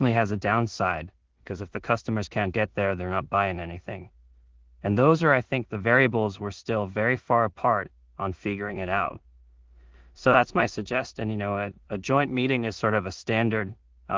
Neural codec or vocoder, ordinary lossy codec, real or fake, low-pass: codec, 16 kHz in and 24 kHz out, 0.4 kbps, LongCat-Audio-Codec, two codebook decoder; Opus, 16 kbps; fake; 7.2 kHz